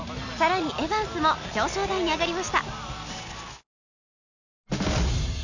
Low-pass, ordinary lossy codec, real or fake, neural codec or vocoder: 7.2 kHz; none; real; none